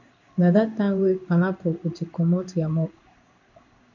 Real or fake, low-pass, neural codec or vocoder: fake; 7.2 kHz; codec, 16 kHz in and 24 kHz out, 1 kbps, XY-Tokenizer